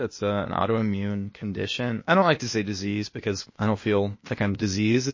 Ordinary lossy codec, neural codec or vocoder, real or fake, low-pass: MP3, 32 kbps; codec, 16 kHz, 0.8 kbps, ZipCodec; fake; 7.2 kHz